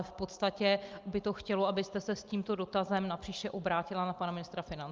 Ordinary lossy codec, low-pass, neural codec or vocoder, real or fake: Opus, 32 kbps; 7.2 kHz; none; real